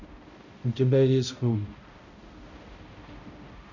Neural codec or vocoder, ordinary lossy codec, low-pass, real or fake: codec, 16 kHz, 0.5 kbps, X-Codec, HuBERT features, trained on balanced general audio; none; 7.2 kHz; fake